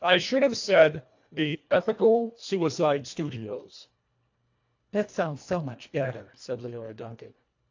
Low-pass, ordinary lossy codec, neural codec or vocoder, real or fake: 7.2 kHz; AAC, 48 kbps; codec, 24 kHz, 1.5 kbps, HILCodec; fake